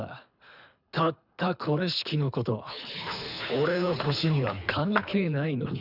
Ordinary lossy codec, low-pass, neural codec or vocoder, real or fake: none; 5.4 kHz; codec, 24 kHz, 3 kbps, HILCodec; fake